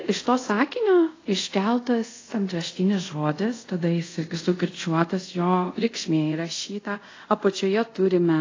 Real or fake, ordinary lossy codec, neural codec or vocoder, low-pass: fake; AAC, 32 kbps; codec, 24 kHz, 0.5 kbps, DualCodec; 7.2 kHz